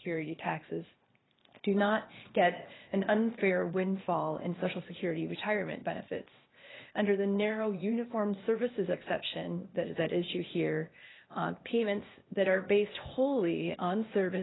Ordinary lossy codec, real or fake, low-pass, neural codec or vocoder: AAC, 16 kbps; fake; 7.2 kHz; codec, 16 kHz, 0.8 kbps, ZipCodec